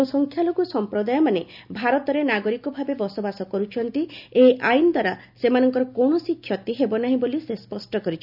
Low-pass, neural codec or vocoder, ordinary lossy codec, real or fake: 5.4 kHz; none; none; real